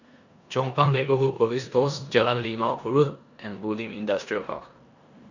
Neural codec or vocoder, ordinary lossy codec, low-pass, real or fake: codec, 16 kHz in and 24 kHz out, 0.9 kbps, LongCat-Audio-Codec, four codebook decoder; none; 7.2 kHz; fake